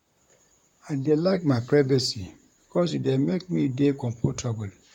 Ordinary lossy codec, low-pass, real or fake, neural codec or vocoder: none; 19.8 kHz; fake; vocoder, 44.1 kHz, 128 mel bands, Pupu-Vocoder